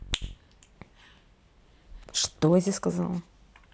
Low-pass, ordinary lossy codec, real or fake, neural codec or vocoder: none; none; real; none